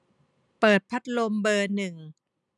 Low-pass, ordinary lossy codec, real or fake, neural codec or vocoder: 10.8 kHz; none; real; none